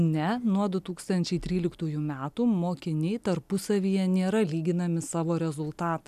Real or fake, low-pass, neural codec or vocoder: real; 14.4 kHz; none